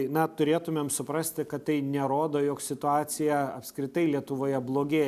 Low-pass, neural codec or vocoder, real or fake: 14.4 kHz; none; real